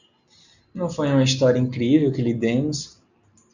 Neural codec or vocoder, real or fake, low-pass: none; real; 7.2 kHz